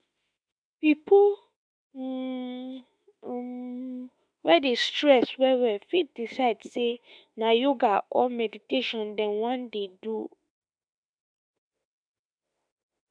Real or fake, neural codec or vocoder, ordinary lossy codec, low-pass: fake; autoencoder, 48 kHz, 32 numbers a frame, DAC-VAE, trained on Japanese speech; none; 9.9 kHz